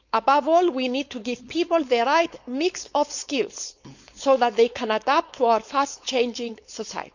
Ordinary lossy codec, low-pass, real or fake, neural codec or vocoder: none; 7.2 kHz; fake; codec, 16 kHz, 4.8 kbps, FACodec